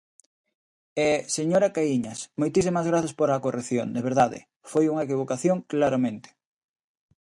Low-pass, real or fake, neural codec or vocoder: 10.8 kHz; real; none